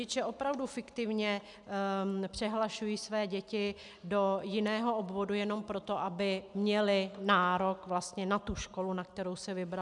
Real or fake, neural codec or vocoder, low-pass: real; none; 10.8 kHz